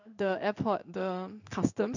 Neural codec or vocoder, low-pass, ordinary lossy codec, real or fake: codec, 16 kHz in and 24 kHz out, 1 kbps, XY-Tokenizer; 7.2 kHz; none; fake